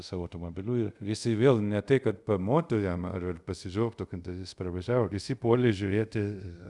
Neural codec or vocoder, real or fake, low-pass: codec, 24 kHz, 0.5 kbps, DualCodec; fake; 10.8 kHz